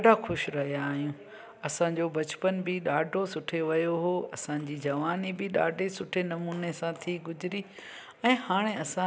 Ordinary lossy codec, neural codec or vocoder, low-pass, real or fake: none; none; none; real